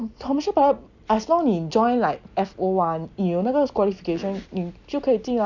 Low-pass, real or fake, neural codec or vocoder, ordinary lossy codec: 7.2 kHz; real; none; none